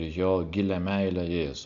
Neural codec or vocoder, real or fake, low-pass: none; real; 7.2 kHz